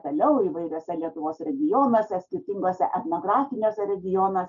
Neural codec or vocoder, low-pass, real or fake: none; 7.2 kHz; real